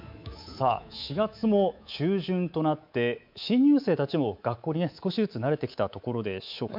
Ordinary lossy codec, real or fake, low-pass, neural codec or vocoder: MP3, 48 kbps; fake; 5.4 kHz; codec, 24 kHz, 3.1 kbps, DualCodec